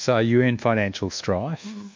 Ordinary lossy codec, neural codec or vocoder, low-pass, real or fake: MP3, 64 kbps; codec, 24 kHz, 1.2 kbps, DualCodec; 7.2 kHz; fake